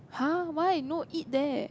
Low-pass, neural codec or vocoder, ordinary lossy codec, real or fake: none; none; none; real